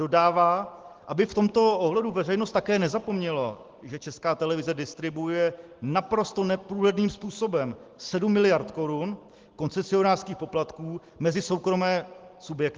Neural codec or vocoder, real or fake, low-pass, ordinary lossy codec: none; real; 7.2 kHz; Opus, 16 kbps